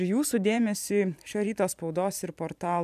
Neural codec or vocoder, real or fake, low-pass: vocoder, 44.1 kHz, 128 mel bands every 512 samples, BigVGAN v2; fake; 14.4 kHz